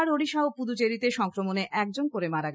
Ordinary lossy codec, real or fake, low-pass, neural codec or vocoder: none; real; none; none